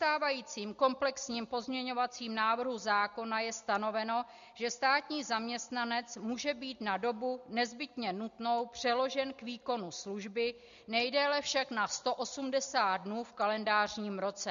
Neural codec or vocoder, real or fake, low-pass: none; real; 7.2 kHz